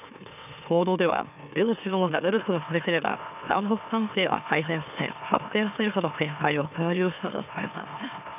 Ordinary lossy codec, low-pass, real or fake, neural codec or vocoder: none; 3.6 kHz; fake; autoencoder, 44.1 kHz, a latent of 192 numbers a frame, MeloTTS